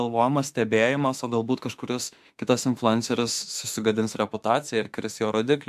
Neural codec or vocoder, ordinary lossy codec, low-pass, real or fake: autoencoder, 48 kHz, 32 numbers a frame, DAC-VAE, trained on Japanese speech; MP3, 96 kbps; 14.4 kHz; fake